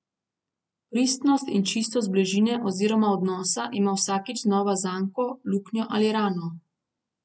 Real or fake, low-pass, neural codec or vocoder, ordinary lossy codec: real; none; none; none